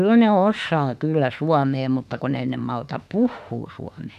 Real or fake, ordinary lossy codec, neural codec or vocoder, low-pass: fake; none; autoencoder, 48 kHz, 32 numbers a frame, DAC-VAE, trained on Japanese speech; 14.4 kHz